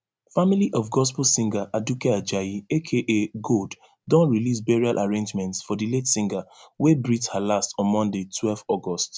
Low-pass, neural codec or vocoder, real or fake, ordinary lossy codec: none; none; real; none